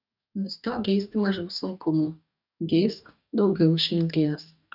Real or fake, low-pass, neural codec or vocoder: fake; 5.4 kHz; codec, 44.1 kHz, 2.6 kbps, DAC